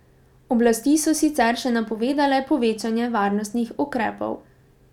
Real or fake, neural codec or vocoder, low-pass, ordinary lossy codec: real; none; 19.8 kHz; none